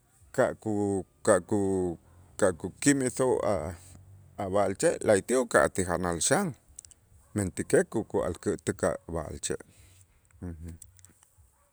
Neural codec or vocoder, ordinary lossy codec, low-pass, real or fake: none; none; none; real